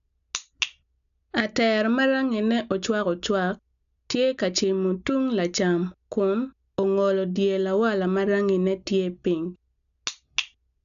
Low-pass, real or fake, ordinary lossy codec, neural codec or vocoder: 7.2 kHz; real; AAC, 96 kbps; none